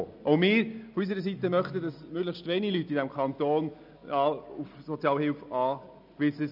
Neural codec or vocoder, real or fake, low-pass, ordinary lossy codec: none; real; 5.4 kHz; none